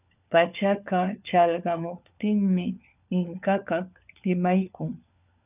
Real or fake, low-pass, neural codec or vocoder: fake; 3.6 kHz; codec, 16 kHz, 4 kbps, FunCodec, trained on LibriTTS, 50 frames a second